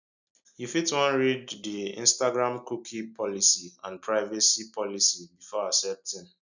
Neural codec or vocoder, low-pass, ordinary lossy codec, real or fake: none; 7.2 kHz; none; real